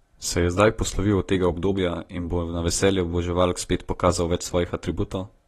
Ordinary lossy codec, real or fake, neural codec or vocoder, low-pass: AAC, 32 kbps; real; none; 19.8 kHz